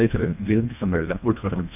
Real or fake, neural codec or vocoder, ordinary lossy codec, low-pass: fake; codec, 24 kHz, 1.5 kbps, HILCodec; none; 3.6 kHz